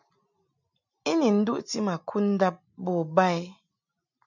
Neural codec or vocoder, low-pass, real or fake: none; 7.2 kHz; real